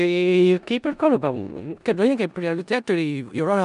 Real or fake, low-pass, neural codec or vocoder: fake; 10.8 kHz; codec, 16 kHz in and 24 kHz out, 0.4 kbps, LongCat-Audio-Codec, four codebook decoder